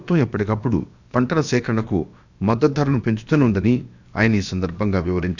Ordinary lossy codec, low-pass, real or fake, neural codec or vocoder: none; 7.2 kHz; fake; codec, 16 kHz, about 1 kbps, DyCAST, with the encoder's durations